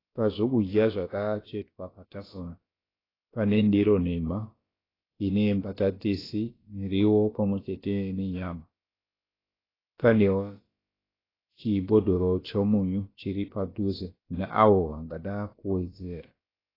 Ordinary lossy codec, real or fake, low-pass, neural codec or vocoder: AAC, 24 kbps; fake; 5.4 kHz; codec, 16 kHz, about 1 kbps, DyCAST, with the encoder's durations